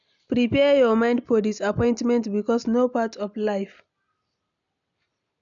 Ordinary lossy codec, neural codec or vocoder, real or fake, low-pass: none; none; real; 7.2 kHz